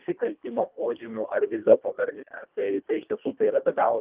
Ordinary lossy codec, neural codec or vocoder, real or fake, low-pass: Opus, 32 kbps; codec, 24 kHz, 1.5 kbps, HILCodec; fake; 3.6 kHz